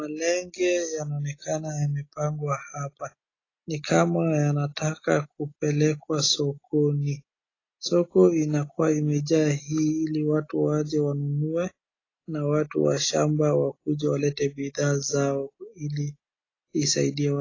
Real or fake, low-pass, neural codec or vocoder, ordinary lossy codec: real; 7.2 kHz; none; AAC, 32 kbps